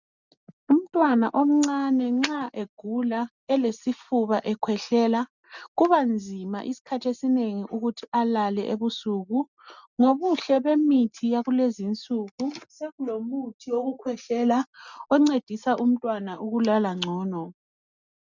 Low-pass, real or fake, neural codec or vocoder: 7.2 kHz; real; none